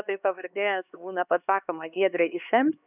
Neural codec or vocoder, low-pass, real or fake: codec, 16 kHz, 2 kbps, X-Codec, HuBERT features, trained on LibriSpeech; 3.6 kHz; fake